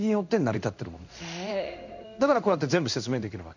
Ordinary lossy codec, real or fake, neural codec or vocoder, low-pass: none; fake; codec, 16 kHz in and 24 kHz out, 1 kbps, XY-Tokenizer; 7.2 kHz